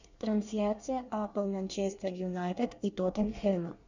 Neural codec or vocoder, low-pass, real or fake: codec, 24 kHz, 1 kbps, SNAC; 7.2 kHz; fake